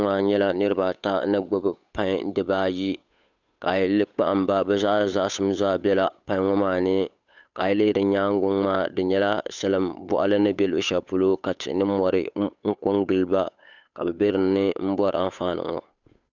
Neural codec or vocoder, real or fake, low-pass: codec, 16 kHz, 8 kbps, FunCodec, trained on Chinese and English, 25 frames a second; fake; 7.2 kHz